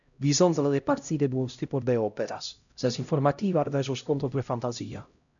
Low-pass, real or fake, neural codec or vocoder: 7.2 kHz; fake; codec, 16 kHz, 0.5 kbps, X-Codec, HuBERT features, trained on LibriSpeech